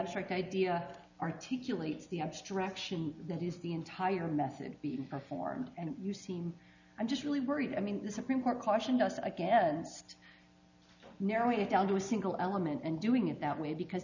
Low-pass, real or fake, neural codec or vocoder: 7.2 kHz; real; none